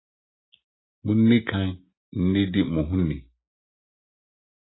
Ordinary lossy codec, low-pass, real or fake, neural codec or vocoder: AAC, 16 kbps; 7.2 kHz; real; none